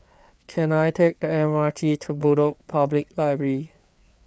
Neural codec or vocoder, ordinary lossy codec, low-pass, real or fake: codec, 16 kHz, 4 kbps, FreqCodec, larger model; none; none; fake